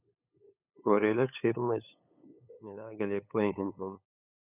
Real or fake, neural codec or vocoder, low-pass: fake; codec, 16 kHz, 8 kbps, FunCodec, trained on LibriTTS, 25 frames a second; 3.6 kHz